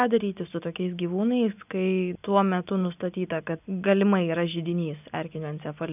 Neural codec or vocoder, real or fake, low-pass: none; real; 3.6 kHz